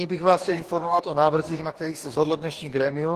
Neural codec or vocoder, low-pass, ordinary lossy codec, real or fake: codec, 44.1 kHz, 2.6 kbps, DAC; 14.4 kHz; Opus, 16 kbps; fake